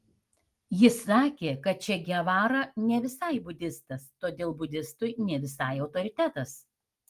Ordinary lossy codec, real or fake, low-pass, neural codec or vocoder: Opus, 24 kbps; real; 14.4 kHz; none